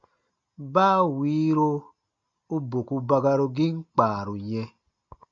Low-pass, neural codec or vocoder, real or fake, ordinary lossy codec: 7.2 kHz; none; real; MP3, 48 kbps